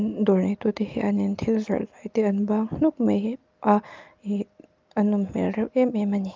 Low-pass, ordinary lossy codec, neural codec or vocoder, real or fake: 7.2 kHz; Opus, 32 kbps; none; real